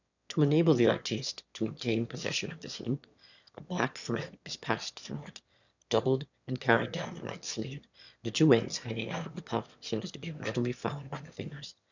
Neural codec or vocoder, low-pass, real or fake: autoencoder, 22.05 kHz, a latent of 192 numbers a frame, VITS, trained on one speaker; 7.2 kHz; fake